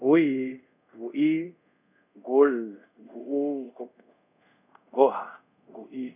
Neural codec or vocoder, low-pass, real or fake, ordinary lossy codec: codec, 24 kHz, 0.5 kbps, DualCodec; 3.6 kHz; fake; none